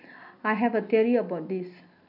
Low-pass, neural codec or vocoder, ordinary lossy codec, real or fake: 5.4 kHz; none; none; real